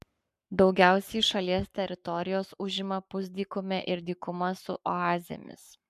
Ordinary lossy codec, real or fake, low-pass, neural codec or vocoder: MP3, 96 kbps; fake; 14.4 kHz; codec, 44.1 kHz, 7.8 kbps, Pupu-Codec